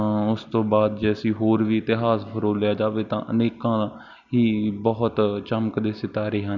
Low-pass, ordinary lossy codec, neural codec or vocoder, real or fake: 7.2 kHz; none; none; real